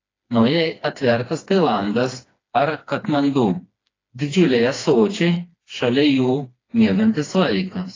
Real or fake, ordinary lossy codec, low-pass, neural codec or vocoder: fake; AAC, 32 kbps; 7.2 kHz; codec, 16 kHz, 2 kbps, FreqCodec, smaller model